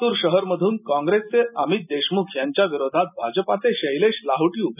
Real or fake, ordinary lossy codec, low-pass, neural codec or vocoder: real; none; 3.6 kHz; none